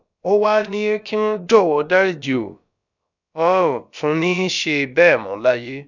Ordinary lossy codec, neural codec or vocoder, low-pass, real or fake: none; codec, 16 kHz, about 1 kbps, DyCAST, with the encoder's durations; 7.2 kHz; fake